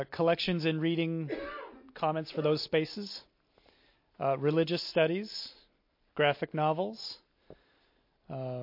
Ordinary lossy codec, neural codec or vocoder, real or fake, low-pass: MP3, 32 kbps; none; real; 5.4 kHz